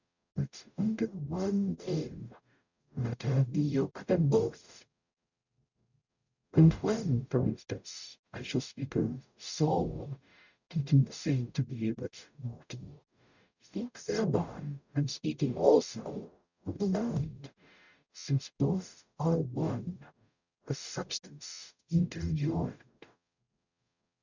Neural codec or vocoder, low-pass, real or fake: codec, 44.1 kHz, 0.9 kbps, DAC; 7.2 kHz; fake